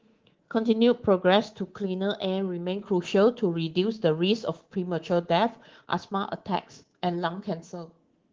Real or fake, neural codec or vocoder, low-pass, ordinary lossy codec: fake; codec, 24 kHz, 3.1 kbps, DualCodec; 7.2 kHz; Opus, 16 kbps